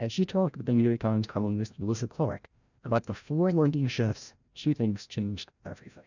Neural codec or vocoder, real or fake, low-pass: codec, 16 kHz, 0.5 kbps, FreqCodec, larger model; fake; 7.2 kHz